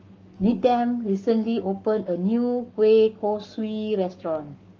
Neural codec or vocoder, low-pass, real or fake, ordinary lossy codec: codec, 44.1 kHz, 7.8 kbps, Pupu-Codec; 7.2 kHz; fake; Opus, 24 kbps